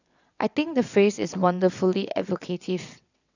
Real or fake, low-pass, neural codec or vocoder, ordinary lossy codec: fake; 7.2 kHz; vocoder, 22.05 kHz, 80 mel bands, WaveNeXt; none